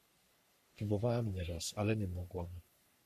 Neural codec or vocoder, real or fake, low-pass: codec, 44.1 kHz, 3.4 kbps, Pupu-Codec; fake; 14.4 kHz